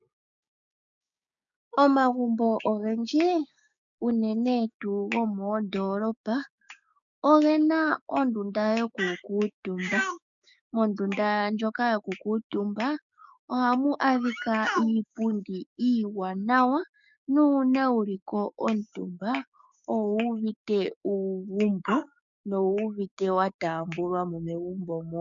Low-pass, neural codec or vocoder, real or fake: 7.2 kHz; codec, 16 kHz, 6 kbps, DAC; fake